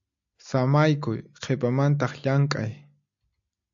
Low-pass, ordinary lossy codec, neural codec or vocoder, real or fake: 7.2 kHz; AAC, 64 kbps; none; real